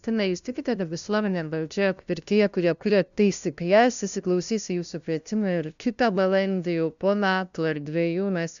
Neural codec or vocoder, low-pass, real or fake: codec, 16 kHz, 0.5 kbps, FunCodec, trained on LibriTTS, 25 frames a second; 7.2 kHz; fake